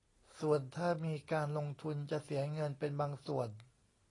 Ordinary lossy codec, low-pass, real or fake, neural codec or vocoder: AAC, 32 kbps; 10.8 kHz; real; none